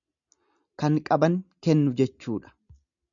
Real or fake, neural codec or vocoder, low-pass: real; none; 7.2 kHz